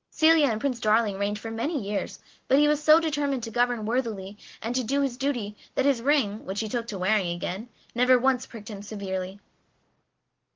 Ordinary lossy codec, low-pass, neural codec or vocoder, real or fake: Opus, 16 kbps; 7.2 kHz; none; real